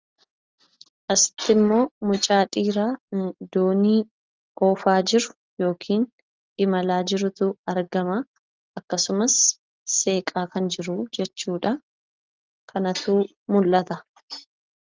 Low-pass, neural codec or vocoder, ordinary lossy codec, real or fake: 7.2 kHz; none; Opus, 24 kbps; real